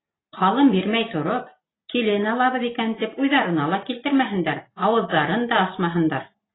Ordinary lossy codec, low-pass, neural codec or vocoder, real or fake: AAC, 16 kbps; 7.2 kHz; none; real